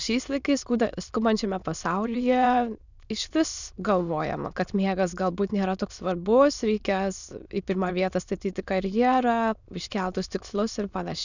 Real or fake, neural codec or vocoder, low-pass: fake; autoencoder, 22.05 kHz, a latent of 192 numbers a frame, VITS, trained on many speakers; 7.2 kHz